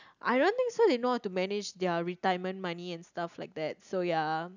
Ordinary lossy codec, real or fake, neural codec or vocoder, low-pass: none; real; none; 7.2 kHz